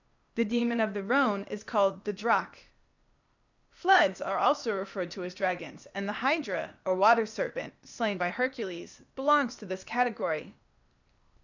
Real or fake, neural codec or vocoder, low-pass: fake; codec, 16 kHz, 0.8 kbps, ZipCodec; 7.2 kHz